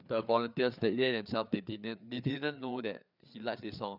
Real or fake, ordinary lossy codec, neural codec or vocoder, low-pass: fake; none; codec, 16 kHz, 4 kbps, FreqCodec, larger model; 5.4 kHz